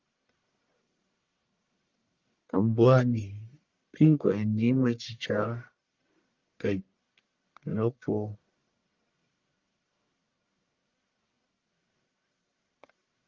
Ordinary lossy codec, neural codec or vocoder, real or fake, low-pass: Opus, 32 kbps; codec, 44.1 kHz, 1.7 kbps, Pupu-Codec; fake; 7.2 kHz